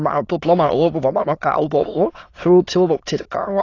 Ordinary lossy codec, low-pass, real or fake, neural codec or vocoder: AAC, 32 kbps; 7.2 kHz; fake; autoencoder, 22.05 kHz, a latent of 192 numbers a frame, VITS, trained on many speakers